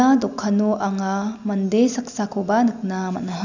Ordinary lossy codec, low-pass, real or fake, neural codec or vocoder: none; 7.2 kHz; real; none